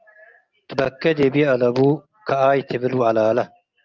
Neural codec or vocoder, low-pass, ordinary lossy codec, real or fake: none; 7.2 kHz; Opus, 24 kbps; real